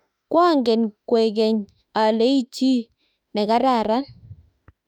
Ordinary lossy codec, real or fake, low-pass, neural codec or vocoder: none; fake; 19.8 kHz; autoencoder, 48 kHz, 32 numbers a frame, DAC-VAE, trained on Japanese speech